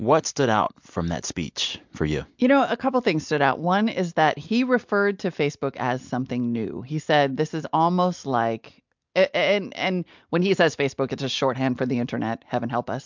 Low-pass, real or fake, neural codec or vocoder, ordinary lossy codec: 7.2 kHz; real; none; MP3, 64 kbps